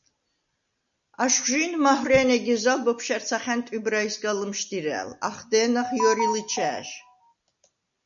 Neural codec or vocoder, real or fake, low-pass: none; real; 7.2 kHz